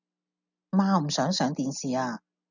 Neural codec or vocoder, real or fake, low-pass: none; real; 7.2 kHz